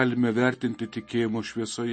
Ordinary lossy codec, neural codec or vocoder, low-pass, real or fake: MP3, 32 kbps; none; 9.9 kHz; real